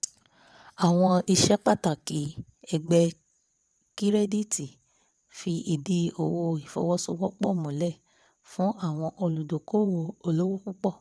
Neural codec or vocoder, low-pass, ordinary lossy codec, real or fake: vocoder, 22.05 kHz, 80 mel bands, WaveNeXt; none; none; fake